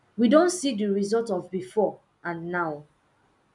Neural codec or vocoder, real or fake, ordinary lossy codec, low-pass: none; real; none; 10.8 kHz